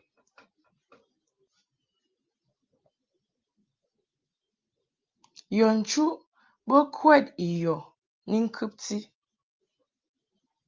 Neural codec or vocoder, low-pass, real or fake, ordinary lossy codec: none; 7.2 kHz; real; Opus, 32 kbps